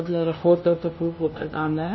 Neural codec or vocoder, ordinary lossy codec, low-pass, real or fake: codec, 16 kHz, 0.5 kbps, FunCodec, trained on LibriTTS, 25 frames a second; MP3, 24 kbps; 7.2 kHz; fake